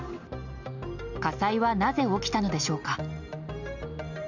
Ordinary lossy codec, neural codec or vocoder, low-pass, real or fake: none; none; 7.2 kHz; real